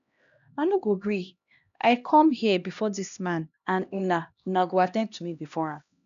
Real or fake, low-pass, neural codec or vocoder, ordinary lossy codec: fake; 7.2 kHz; codec, 16 kHz, 1 kbps, X-Codec, HuBERT features, trained on LibriSpeech; none